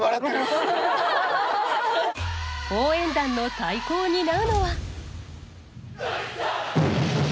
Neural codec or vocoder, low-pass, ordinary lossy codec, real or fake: none; none; none; real